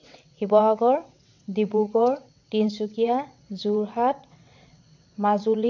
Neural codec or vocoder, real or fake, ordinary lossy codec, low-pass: vocoder, 22.05 kHz, 80 mel bands, WaveNeXt; fake; none; 7.2 kHz